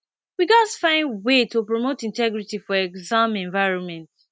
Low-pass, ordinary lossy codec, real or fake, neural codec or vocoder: none; none; real; none